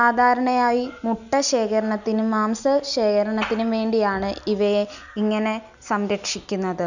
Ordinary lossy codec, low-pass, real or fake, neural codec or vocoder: none; 7.2 kHz; real; none